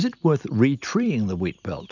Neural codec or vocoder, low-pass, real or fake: none; 7.2 kHz; real